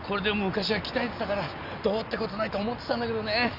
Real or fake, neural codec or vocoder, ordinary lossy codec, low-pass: real; none; none; 5.4 kHz